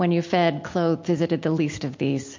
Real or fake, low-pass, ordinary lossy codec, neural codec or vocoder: real; 7.2 kHz; MP3, 48 kbps; none